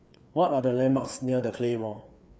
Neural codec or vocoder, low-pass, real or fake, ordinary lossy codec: codec, 16 kHz, 4 kbps, FreqCodec, larger model; none; fake; none